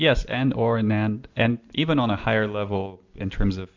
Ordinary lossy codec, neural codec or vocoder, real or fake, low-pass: MP3, 64 kbps; none; real; 7.2 kHz